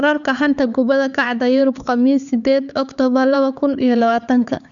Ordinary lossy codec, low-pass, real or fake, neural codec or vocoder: none; 7.2 kHz; fake; codec, 16 kHz, 4 kbps, X-Codec, HuBERT features, trained on LibriSpeech